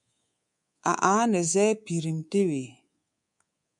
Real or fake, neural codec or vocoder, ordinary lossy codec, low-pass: fake; codec, 24 kHz, 3.1 kbps, DualCodec; MP3, 96 kbps; 10.8 kHz